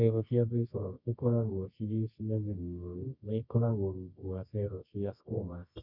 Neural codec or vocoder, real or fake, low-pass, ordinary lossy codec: codec, 24 kHz, 0.9 kbps, WavTokenizer, medium music audio release; fake; 5.4 kHz; none